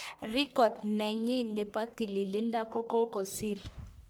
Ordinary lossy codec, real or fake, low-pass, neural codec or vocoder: none; fake; none; codec, 44.1 kHz, 1.7 kbps, Pupu-Codec